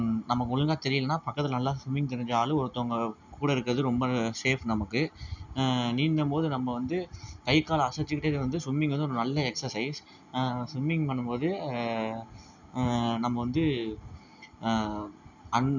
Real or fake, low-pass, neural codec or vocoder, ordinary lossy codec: real; 7.2 kHz; none; none